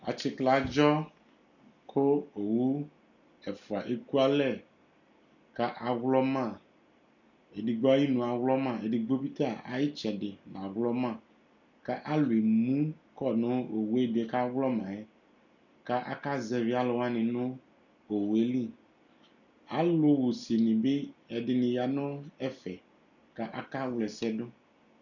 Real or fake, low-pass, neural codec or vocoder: real; 7.2 kHz; none